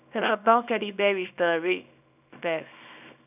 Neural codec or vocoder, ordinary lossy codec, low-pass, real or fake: codec, 24 kHz, 0.9 kbps, WavTokenizer, small release; none; 3.6 kHz; fake